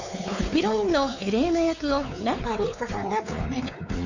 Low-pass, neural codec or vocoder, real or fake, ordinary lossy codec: 7.2 kHz; codec, 16 kHz, 4 kbps, X-Codec, WavLM features, trained on Multilingual LibriSpeech; fake; none